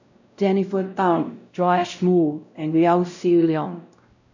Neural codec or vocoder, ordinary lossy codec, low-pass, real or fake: codec, 16 kHz, 0.5 kbps, X-Codec, WavLM features, trained on Multilingual LibriSpeech; none; 7.2 kHz; fake